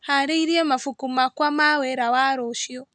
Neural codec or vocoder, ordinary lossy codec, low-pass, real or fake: none; none; none; real